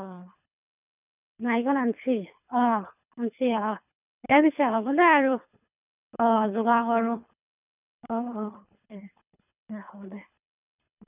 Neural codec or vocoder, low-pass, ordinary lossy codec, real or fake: codec, 24 kHz, 3 kbps, HILCodec; 3.6 kHz; none; fake